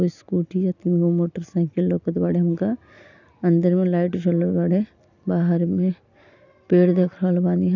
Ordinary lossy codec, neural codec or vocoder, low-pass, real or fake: none; none; 7.2 kHz; real